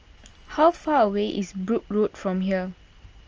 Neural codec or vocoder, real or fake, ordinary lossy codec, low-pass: none; real; Opus, 24 kbps; 7.2 kHz